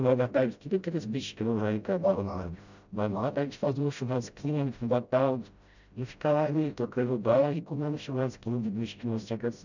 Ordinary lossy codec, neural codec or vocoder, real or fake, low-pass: none; codec, 16 kHz, 0.5 kbps, FreqCodec, smaller model; fake; 7.2 kHz